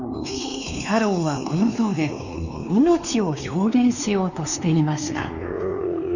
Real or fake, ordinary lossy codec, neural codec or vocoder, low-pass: fake; none; codec, 16 kHz, 2 kbps, X-Codec, WavLM features, trained on Multilingual LibriSpeech; 7.2 kHz